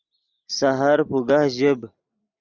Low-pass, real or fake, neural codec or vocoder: 7.2 kHz; real; none